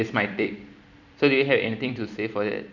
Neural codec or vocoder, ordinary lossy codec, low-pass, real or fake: vocoder, 44.1 kHz, 128 mel bands every 256 samples, BigVGAN v2; none; 7.2 kHz; fake